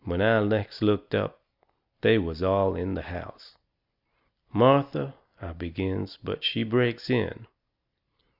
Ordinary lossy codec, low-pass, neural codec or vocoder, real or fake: Opus, 64 kbps; 5.4 kHz; none; real